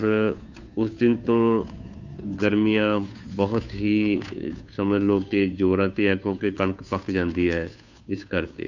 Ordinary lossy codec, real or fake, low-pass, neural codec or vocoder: none; fake; 7.2 kHz; codec, 16 kHz, 2 kbps, FunCodec, trained on Chinese and English, 25 frames a second